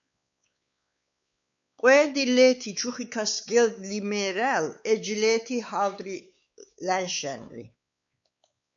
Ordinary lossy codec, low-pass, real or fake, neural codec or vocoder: MP3, 64 kbps; 7.2 kHz; fake; codec, 16 kHz, 4 kbps, X-Codec, WavLM features, trained on Multilingual LibriSpeech